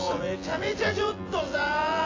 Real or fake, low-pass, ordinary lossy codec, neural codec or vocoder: fake; 7.2 kHz; AAC, 32 kbps; vocoder, 24 kHz, 100 mel bands, Vocos